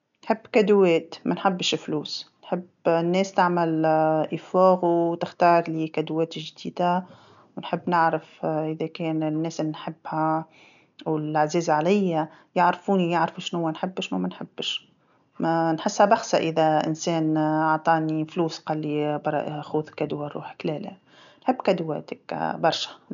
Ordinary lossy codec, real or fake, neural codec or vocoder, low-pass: none; real; none; 7.2 kHz